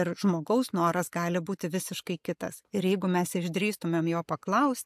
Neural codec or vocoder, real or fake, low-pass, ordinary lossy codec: none; real; 14.4 kHz; AAC, 96 kbps